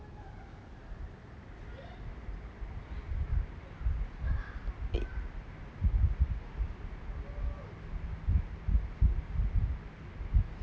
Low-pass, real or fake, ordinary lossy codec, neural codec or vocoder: none; real; none; none